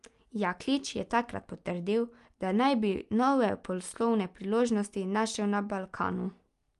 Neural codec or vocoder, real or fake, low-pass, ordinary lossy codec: none; real; 10.8 kHz; Opus, 32 kbps